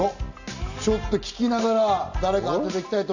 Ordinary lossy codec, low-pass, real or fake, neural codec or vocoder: none; 7.2 kHz; real; none